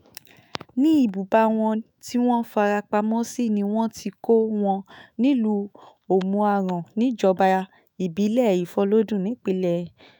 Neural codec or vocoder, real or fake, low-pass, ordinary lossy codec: autoencoder, 48 kHz, 128 numbers a frame, DAC-VAE, trained on Japanese speech; fake; none; none